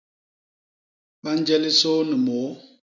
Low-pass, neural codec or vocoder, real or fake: 7.2 kHz; none; real